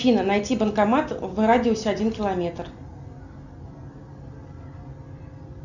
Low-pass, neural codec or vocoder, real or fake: 7.2 kHz; none; real